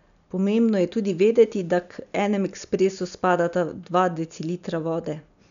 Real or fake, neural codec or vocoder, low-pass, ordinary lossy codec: real; none; 7.2 kHz; none